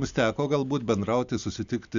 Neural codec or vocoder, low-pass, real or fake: none; 7.2 kHz; real